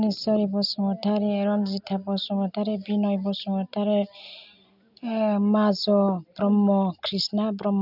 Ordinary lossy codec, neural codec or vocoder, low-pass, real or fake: none; none; 5.4 kHz; real